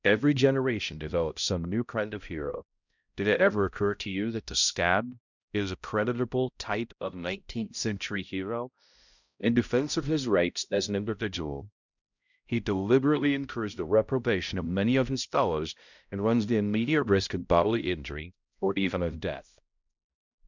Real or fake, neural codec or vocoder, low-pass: fake; codec, 16 kHz, 0.5 kbps, X-Codec, HuBERT features, trained on balanced general audio; 7.2 kHz